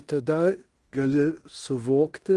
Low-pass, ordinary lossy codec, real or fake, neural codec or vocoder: 10.8 kHz; Opus, 24 kbps; fake; codec, 16 kHz in and 24 kHz out, 0.9 kbps, LongCat-Audio-Codec, fine tuned four codebook decoder